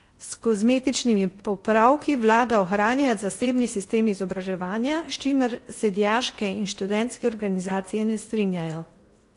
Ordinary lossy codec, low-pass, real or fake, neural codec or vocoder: AAC, 48 kbps; 10.8 kHz; fake; codec, 16 kHz in and 24 kHz out, 0.8 kbps, FocalCodec, streaming, 65536 codes